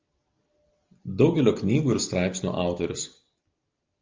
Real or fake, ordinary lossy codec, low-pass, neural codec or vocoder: real; Opus, 32 kbps; 7.2 kHz; none